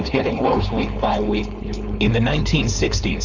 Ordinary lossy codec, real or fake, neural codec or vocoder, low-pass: Opus, 64 kbps; fake; codec, 16 kHz, 4.8 kbps, FACodec; 7.2 kHz